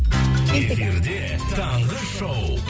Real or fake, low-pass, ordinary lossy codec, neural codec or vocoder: real; none; none; none